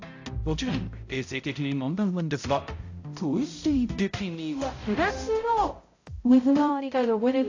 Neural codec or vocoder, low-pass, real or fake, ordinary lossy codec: codec, 16 kHz, 0.5 kbps, X-Codec, HuBERT features, trained on balanced general audio; 7.2 kHz; fake; AAC, 48 kbps